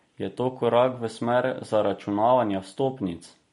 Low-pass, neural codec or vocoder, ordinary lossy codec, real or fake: 10.8 kHz; none; MP3, 48 kbps; real